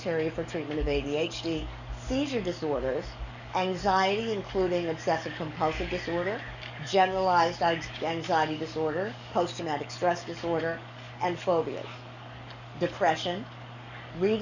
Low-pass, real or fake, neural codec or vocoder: 7.2 kHz; fake; codec, 44.1 kHz, 7.8 kbps, DAC